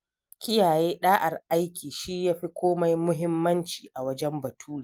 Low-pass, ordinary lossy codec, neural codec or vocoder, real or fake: 19.8 kHz; Opus, 32 kbps; none; real